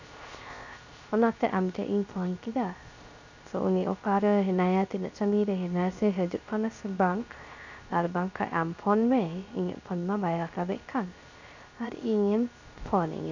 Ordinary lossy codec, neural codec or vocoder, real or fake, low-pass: none; codec, 16 kHz, 0.3 kbps, FocalCodec; fake; 7.2 kHz